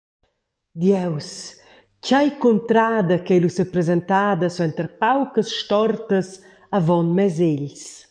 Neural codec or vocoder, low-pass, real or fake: codec, 44.1 kHz, 7.8 kbps, DAC; 9.9 kHz; fake